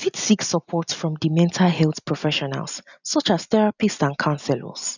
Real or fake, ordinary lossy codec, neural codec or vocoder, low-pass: real; none; none; 7.2 kHz